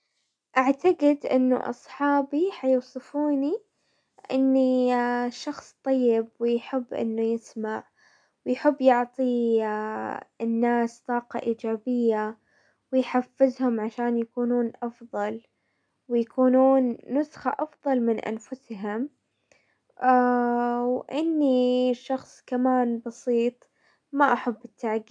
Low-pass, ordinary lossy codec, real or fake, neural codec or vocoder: 9.9 kHz; none; real; none